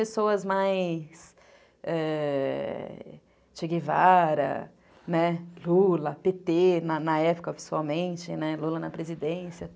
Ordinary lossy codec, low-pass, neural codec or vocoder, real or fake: none; none; none; real